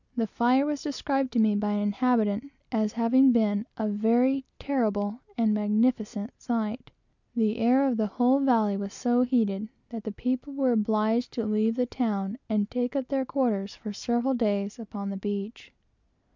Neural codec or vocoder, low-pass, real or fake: none; 7.2 kHz; real